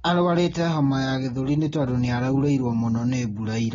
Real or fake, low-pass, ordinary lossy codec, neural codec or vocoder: real; 7.2 kHz; AAC, 24 kbps; none